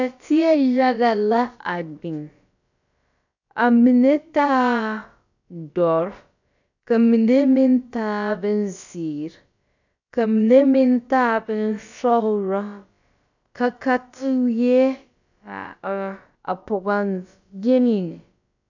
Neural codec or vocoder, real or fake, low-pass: codec, 16 kHz, about 1 kbps, DyCAST, with the encoder's durations; fake; 7.2 kHz